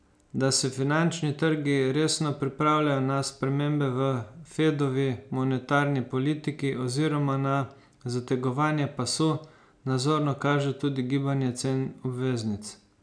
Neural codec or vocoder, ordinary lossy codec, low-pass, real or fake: none; none; 9.9 kHz; real